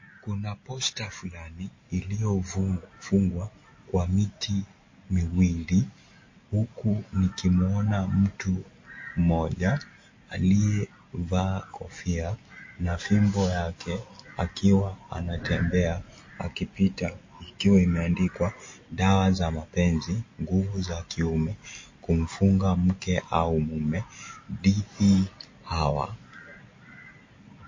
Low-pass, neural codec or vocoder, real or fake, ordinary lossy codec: 7.2 kHz; none; real; MP3, 32 kbps